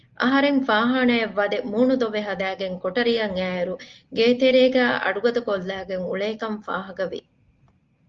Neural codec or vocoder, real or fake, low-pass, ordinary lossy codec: none; real; 7.2 kHz; Opus, 32 kbps